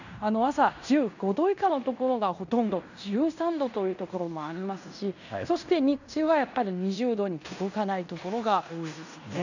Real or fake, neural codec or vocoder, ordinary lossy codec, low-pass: fake; codec, 16 kHz in and 24 kHz out, 0.9 kbps, LongCat-Audio-Codec, fine tuned four codebook decoder; none; 7.2 kHz